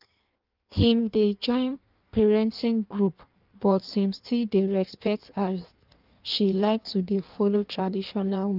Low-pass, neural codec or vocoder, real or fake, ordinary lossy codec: 5.4 kHz; codec, 16 kHz in and 24 kHz out, 1.1 kbps, FireRedTTS-2 codec; fake; Opus, 24 kbps